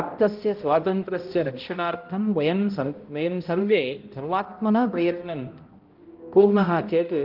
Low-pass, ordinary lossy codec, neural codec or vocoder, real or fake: 5.4 kHz; Opus, 24 kbps; codec, 16 kHz, 0.5 kbps, X-Codec, HuBERT features, trained on balanced general audio; fake